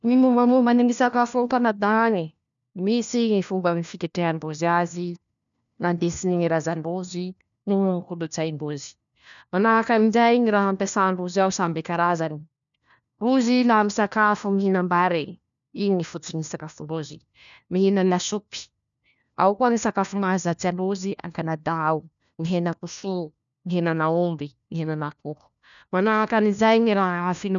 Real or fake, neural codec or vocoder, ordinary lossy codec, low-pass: fake; codec, 16 kHz, 1 kbps, FunCodec, trained on LibriTTS, 50 frames a second; none; 7.2 kHz